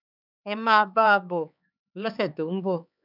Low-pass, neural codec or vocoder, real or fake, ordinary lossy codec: 5.4 kHz; codec, 16 kHz, 4 kbps, X-Codec, HuBERT features, trained on LibriSpeech; fake; none